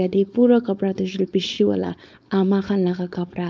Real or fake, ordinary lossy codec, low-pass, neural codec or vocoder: fake; none; none; codec, 16 kHz, 4.8 kbps, FACodec